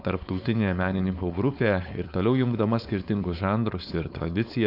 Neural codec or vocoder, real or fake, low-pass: codec, 16 kHz, 4.8 kbps, FACodec; fake; 5.4 kHz